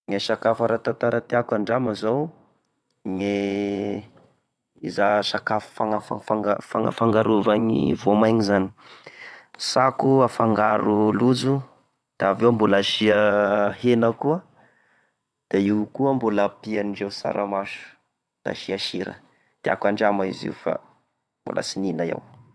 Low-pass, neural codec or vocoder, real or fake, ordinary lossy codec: none; vocoder, 22.05 kHz, 80 mel bands, WaveNeXt; fake; none